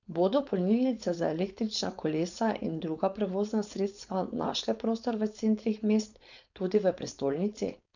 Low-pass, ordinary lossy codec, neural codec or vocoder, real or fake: 7.2 kHz; none; codec, 16 kHz, 4.8 kbps, FACodec; fake